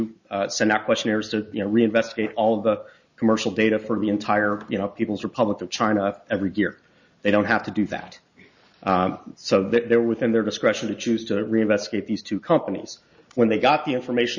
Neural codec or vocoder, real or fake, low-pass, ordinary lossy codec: none; real; 7.2 kHz; Opus, 64 kbps